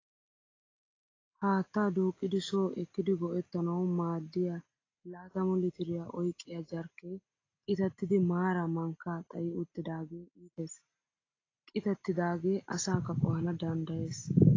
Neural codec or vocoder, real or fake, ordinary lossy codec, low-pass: none; real; AAC, 32 kbps; 7.2 kHz